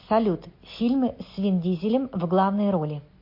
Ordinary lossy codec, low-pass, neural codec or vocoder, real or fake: MP3, 32 kbps; 5.4 kHz; none; real